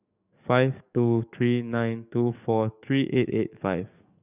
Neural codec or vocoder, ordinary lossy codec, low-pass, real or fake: codec, 44.1 kHz, 7.8 kbps, DAC; none; 3.6 kHz; fake